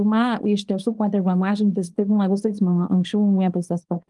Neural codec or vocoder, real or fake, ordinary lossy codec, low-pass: codec, 24 kHz, 0.9 kbps, WavTokenizer, small release; fake; Opus, 24 kbps; 10.8 kHz